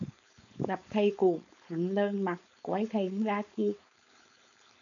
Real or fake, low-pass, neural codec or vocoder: fake; 7.2 kHz; codec, 16 kHz, 4.8 kbps, FACodec